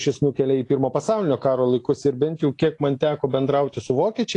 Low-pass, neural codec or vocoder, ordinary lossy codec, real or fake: 10.8 kHz; none; AAC, 48 kbps; real